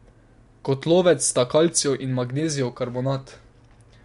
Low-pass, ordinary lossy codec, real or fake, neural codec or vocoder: 10.8 kHz; MP3, 64 kbps; real; none